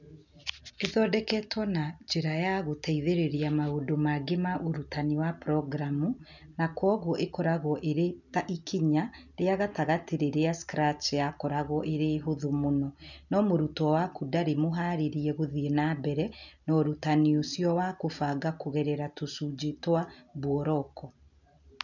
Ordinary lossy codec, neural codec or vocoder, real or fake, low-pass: none; none; real; 7.2 kHz